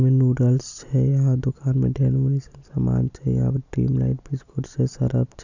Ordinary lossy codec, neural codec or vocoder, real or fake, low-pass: none; none; real; 7.2 kHz